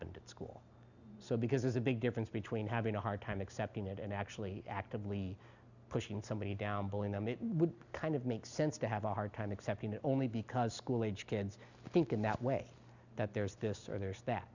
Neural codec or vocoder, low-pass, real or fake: none; 7.2 kHz; real